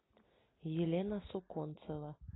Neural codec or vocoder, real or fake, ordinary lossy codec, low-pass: none; real; AAC, 16 kbps; 7.2 kHz